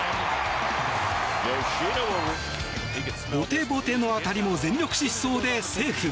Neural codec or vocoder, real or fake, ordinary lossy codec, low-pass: none; real; none; none